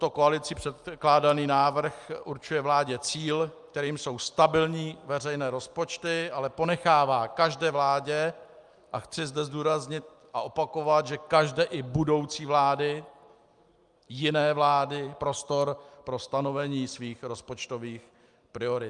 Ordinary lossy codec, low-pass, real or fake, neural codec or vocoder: Opus, 32 kbps; 10.8 kHz; real; none